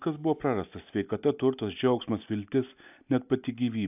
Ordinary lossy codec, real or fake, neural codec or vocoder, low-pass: AAC, 32 kbps; real; none; 3.6 kHz